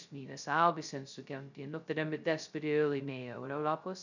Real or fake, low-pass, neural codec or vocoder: fake; 7.2 kHz; codec, 16 kHz, 0.2 kbps, FocalCodec